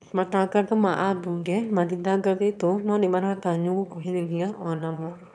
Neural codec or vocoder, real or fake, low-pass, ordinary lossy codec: autoencoder, 22.05 kHz, a latent of 192 numbers a frame, VITS, trained on one speaker; fake; none; none